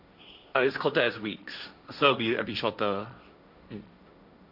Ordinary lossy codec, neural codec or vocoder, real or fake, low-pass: none; codec, 16 kHz, 1.1 kbps, Voila-Tokenizer; fake; 5.4 kHz